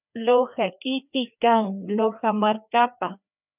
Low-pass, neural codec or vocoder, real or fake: 3.6 kHz; codec, 16 kHz, 2 kbps, FreqCodec, larger model; fake